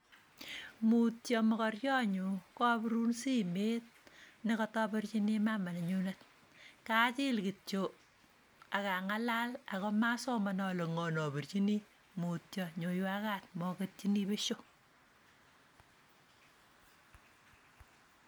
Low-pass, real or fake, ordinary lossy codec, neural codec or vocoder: none; real; none; none